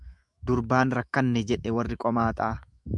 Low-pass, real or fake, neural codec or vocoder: 10.8 kHz; fake; autoencoder, 48 kHz, 128 numbers a frame, DAC-VAE, trained on Japanese speech